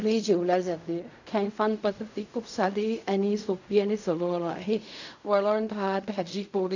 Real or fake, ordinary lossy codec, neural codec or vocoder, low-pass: fake; none; codec, 16 kHz in and 24 kHz out, 0.4 kbps, LongCat-Audio-Codec, fine tuned four codebook decoder; 7.2 kHz